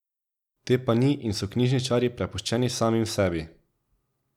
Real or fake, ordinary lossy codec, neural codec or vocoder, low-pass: real; none; none; 19.8 kHz